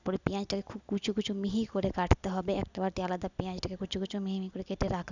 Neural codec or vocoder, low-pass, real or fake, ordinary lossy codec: none; 7.2 kHz; real; none